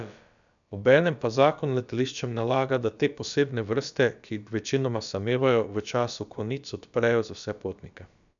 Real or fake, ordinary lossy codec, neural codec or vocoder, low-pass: fake; none; codec, 16 kHz, about 1 kbps, DyCAST, with the encoder's durations; 7.2 kHz